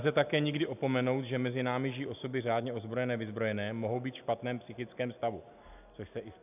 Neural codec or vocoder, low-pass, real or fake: none; 3.6 kHz; real